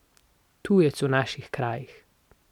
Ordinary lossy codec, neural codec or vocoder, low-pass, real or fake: none; none; 19.8 kHz; real